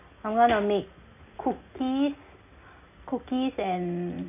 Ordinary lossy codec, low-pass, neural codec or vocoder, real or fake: none; 3.6 kHz; none; real